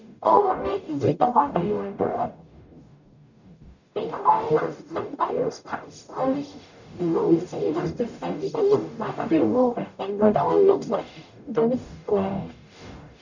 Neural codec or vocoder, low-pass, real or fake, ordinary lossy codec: codec, 44.1 kHz, 0.9 kbps, DAC; 7.2 kHz; fake; none